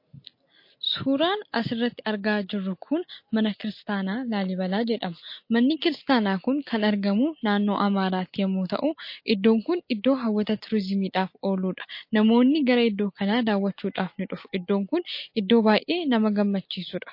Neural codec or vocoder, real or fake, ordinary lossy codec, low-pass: none; real; MP3, 32 kbps; 5.4 kHz